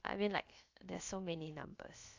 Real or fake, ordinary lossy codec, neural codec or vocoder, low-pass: fake; AAC, 48 kbps; codec, 16 kHz, about 1 kbps, DyCAST, with the encoder's durations; 7.2 kHz